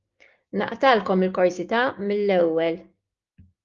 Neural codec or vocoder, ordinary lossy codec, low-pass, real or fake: codec, 16 kHz, 6 kbps, DAC; Opus, 32 kbps; 7.2 kHz; fake